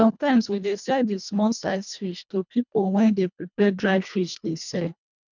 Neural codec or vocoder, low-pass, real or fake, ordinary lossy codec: codec, 24 kHz, 1.5 kbps, HILCodec; 7.2 kHz; fake; none